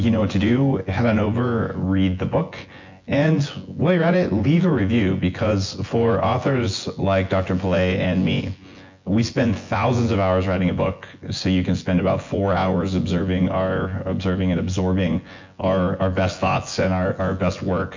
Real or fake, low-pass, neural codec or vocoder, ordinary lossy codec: fake; 7.2 kHz; vocoder, 24 kHz, 100 mel bands, Vocos; MP3, 48 kbps